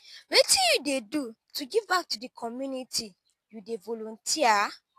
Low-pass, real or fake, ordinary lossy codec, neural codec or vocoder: 14.4 kHz; real; AAC, 64 kbps; none